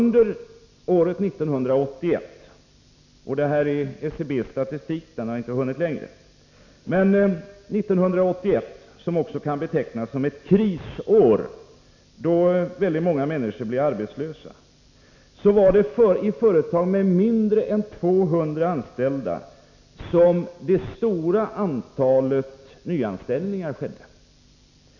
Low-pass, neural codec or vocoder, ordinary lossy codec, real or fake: 7.2 kHz; none; none; real